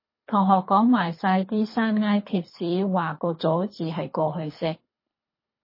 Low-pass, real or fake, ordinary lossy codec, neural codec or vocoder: 5.4 kHz; fake; MP3, 24 kbps; codec, 24 kHz, 3 kbps, HILCodec